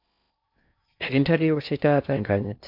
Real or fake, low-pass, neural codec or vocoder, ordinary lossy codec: fake; 5.4 kHz; codec, 16 kHz in and 24 kHz out, 0.6 kbps, FocalCodec, streaming, 2048 codes; AAC, 48 kbps